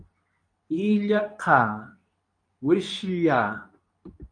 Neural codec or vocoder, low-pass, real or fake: codec, 24 kHz, 0.9 kbps, WavTokenizer, medium speech release version 2; 9.9 kHz; fake